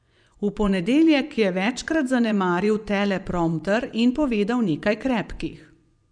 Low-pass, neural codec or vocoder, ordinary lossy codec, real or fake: 9.9 kHz; vocoder, 24 kHz, 100 mel bands, Vocos; none; fake